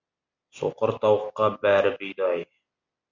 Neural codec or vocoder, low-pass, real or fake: none; 7.2 kHz; real